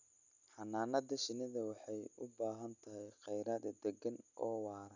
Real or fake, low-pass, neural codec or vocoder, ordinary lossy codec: real; 7.2 kHz; none; none